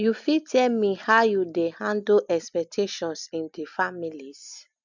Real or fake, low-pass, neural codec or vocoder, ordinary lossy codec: real; 7.2 kHz; none; none